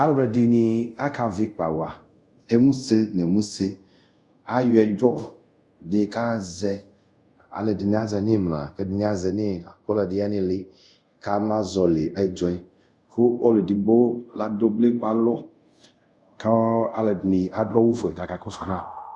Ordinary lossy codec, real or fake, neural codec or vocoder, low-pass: Opus, 64 kbps; fake; codec, 24 kHz, 0.5 kbps, DualCodec; 10.8 kHz